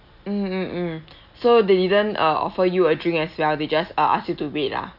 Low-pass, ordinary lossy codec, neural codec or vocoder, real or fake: 5.4 kHz; none; none; real